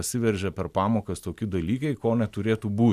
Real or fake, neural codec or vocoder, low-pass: real; none; 14.4 kHz